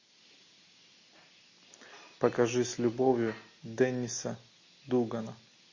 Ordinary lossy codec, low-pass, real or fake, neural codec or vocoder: MP3, 32 kbps; 7.2 kHz; real; none